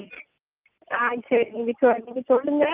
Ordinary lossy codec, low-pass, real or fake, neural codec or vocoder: Opus, 24 kbps; 3.6 kHz; real; none